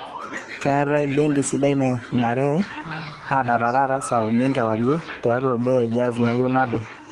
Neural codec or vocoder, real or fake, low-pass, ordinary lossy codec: codec, 24 kHz, 1 kbps, SNAC; fake; 10.8 kHz; Opus, 24 kbps